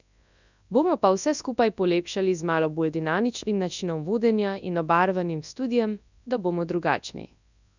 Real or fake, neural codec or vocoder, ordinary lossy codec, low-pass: fake; codec, 24 kHz, 0.9 kbps, WavTokenizer, large speech release; none; 7.2 kHz